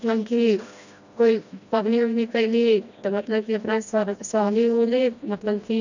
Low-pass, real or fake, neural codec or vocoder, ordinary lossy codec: 7.2 kHz; fake; codec, 16 kHz, 1 kbps, FreqCodec, smaller model; none